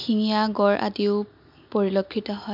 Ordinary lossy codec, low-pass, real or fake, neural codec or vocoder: MP3, 48 kbps; 5.4 kHz; real; none